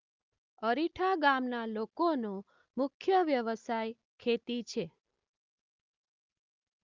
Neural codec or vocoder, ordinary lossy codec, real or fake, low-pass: none; Opus, 24 kbps; real; 7.2 kHz